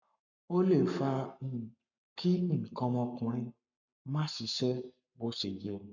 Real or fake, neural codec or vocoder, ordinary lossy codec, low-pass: fake; codec, 44.1 kHz, 7.8 kbps, Pupu-Codec; none; 7.2 kHz